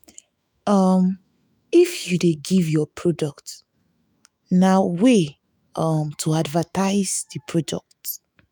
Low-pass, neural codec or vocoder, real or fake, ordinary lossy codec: none; autoencoder, 48 kHz, 128 numbers a frame, DAC-VAE, trained on Japanese speech; fake; none